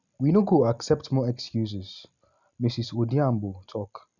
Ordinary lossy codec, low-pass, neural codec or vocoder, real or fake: Opus, 64 kbps; 7.2 kHz; none; real